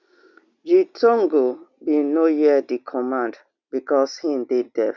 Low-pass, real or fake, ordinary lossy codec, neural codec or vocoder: 7.2 kHz; real; none; none